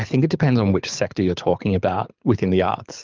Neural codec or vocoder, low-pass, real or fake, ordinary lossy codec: codec, 24 kHz, 6 kbps, HILCodec; 7.2 kHz; fake; Opus, 32 kbps